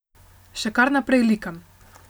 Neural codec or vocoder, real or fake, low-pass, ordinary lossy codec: none; real; none; none